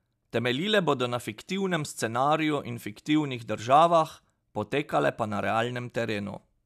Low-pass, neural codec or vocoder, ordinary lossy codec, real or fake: 14.4 kHz; none; none; real